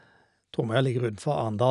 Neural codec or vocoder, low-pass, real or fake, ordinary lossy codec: none; 10.8 kHz; real; none